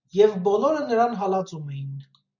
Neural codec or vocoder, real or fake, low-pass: none; real; 7.2 kHz